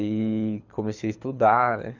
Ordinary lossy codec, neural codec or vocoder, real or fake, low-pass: none; codec, 24 kHz, 6 kbps, HILCodec; fake; 7.2 kHz